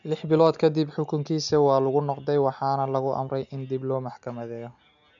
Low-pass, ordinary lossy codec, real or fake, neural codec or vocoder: 7.2 kHz; none; real; none